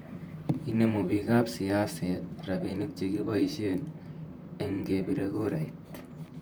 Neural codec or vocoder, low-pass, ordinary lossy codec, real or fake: vocoder, 44.1 kHz, 128 mel bands, Pupu-Vocoder; none; none; fake